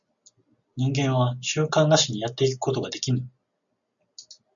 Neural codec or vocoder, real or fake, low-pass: none; real; 7.2 kHz